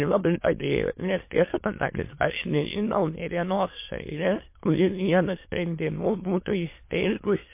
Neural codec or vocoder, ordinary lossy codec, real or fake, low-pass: autoencoder, 22.05 kHz, a latent of 192 numbers a frame, VITS, trained on many speakers; MP3, 24 kbps; fake; 3.6 kHz